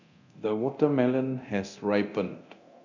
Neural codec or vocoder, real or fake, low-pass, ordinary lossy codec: codec, 24 kHz, 0.9 kbps, DualCodec; fake; 7.2 kHz; none